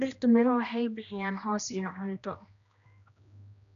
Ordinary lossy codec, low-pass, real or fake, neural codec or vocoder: none; 7.2 kHz; fake; codec, 16 kHz, 1 kbps, X-Codec, HuBERT features, trained on general audio